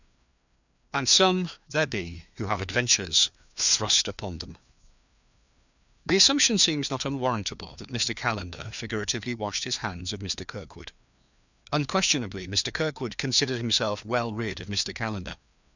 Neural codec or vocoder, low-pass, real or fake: codec, 16 kHz, 2 kbps, FreqCodec, larger model; 7.2 kHz; fake